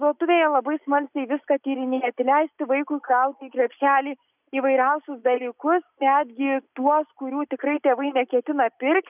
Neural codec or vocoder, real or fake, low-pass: none; real; 3.6 kHz